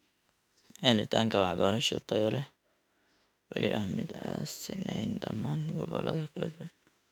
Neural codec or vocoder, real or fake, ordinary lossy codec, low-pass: autoencoder, 48 kHz, 32 numbers a frame, DAC-VAE, trained on Japanese speech; fake; none; 19.8 kHz